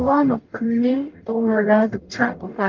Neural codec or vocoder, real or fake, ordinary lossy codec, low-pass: codec, 44.1 kHz, 0.9 kbps, DAC; fake; Opus, 32 kbps; 7.2 kHz